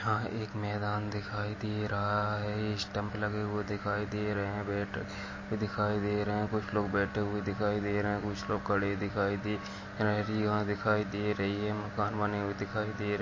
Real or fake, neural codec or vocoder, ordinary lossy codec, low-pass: real; none; MP3, 32 kbps; 7.2 kHz